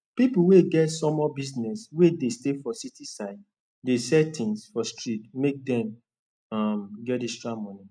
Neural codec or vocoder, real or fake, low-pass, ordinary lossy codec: none; real; 9.9 kHz; none